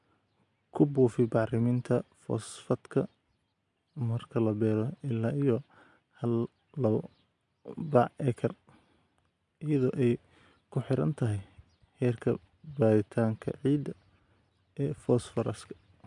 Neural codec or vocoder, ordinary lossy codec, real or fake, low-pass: none; MP3, 64 kbps; real; 10.8 kHz